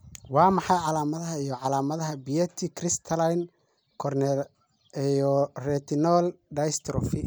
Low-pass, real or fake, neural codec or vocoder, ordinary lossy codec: none; real; none; none